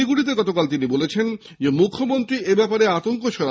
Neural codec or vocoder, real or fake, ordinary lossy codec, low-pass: none; real; none; none